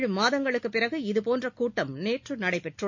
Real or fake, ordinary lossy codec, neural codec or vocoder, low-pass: real; MP3, 48 kbps; none; 7.2 kHz